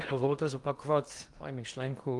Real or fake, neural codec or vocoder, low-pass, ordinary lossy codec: fake; codec, 16 kHz in and 24 kHz out, 0.8 kbps, FocalCodec, streaming, 65536 codes; 10.8 kHz; Opus, 24 kbps